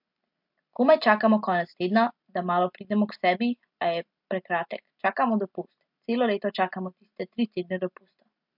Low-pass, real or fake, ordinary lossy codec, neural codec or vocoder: 5.4 kHz; real; MP3, 48 kbps; none